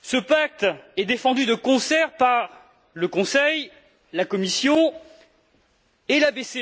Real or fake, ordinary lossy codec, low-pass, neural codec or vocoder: real; none; none; none